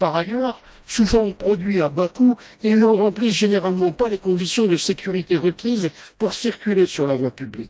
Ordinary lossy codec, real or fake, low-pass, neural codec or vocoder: none; fake; none; codec, 16 kHz, 1 kbps, FreqCodec, smaller model